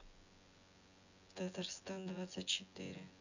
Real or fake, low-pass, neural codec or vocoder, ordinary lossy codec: fake; 7.2 kHz; vocoder, 24 kHz, 100 mel bands, Vocos; none